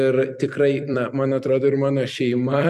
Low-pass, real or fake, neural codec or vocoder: 14.4 kHz; fake; vocoder, 44.1 kHz, 128 mel bands every 256 samples, BigVGAN v2